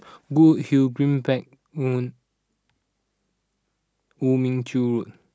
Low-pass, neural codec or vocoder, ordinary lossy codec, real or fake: none; none; none; real